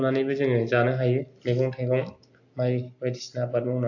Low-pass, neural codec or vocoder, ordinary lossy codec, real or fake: 7.2 kHz; none; AAC, 48 kbps; real